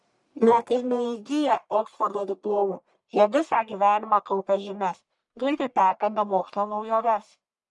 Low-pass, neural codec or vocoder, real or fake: 10.8 kHz; codec, 44.1 kHz, 1.7 kbps, Pupu-Codec; fake